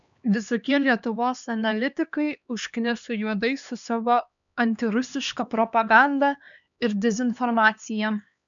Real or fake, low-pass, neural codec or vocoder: fake; 7.2 kHz; codec, 16 kHz, 2 kbps, X-Codec, HuBERT features, trained on LibriSpeech